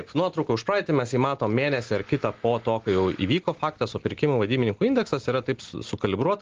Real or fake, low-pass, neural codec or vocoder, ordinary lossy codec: real; 7.2 kHz; none; Opus, 16 kbps